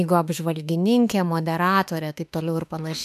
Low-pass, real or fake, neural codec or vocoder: 14.4 kHz; fake; autoencoder, 48 kHz, 32 numbers a frame, DAC-VAE, trained on Japanese speech